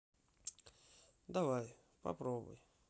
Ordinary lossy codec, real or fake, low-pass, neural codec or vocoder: none; real; none; none